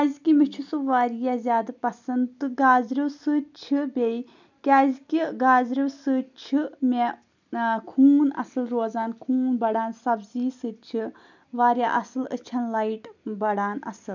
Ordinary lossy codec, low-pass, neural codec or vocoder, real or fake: none; 7.2 kHz; none; real